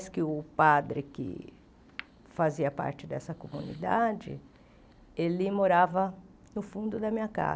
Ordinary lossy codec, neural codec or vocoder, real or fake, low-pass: none; none; real; none